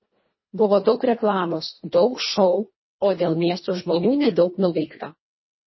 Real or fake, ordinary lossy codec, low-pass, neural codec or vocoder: fake; MP3, 24 kbps; 7.2 kHz; codec, 24 kHz, 1.5 kbps, HILCodec